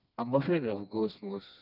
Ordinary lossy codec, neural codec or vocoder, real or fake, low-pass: none; codec, 16 kHz, 2 kbps, FreqCodec, smaller model; fake; 5.4 kHz